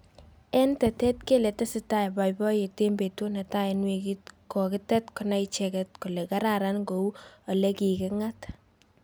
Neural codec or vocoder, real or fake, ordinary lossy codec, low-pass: none; real; none; none